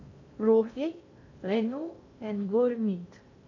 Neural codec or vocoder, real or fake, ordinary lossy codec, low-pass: codec, 16 kHz in and 24 kHz out, 0.6 kbps, FocalCodec, streaming, 2048 codes; fake; AAC, 48 kbps; 7.2 kHz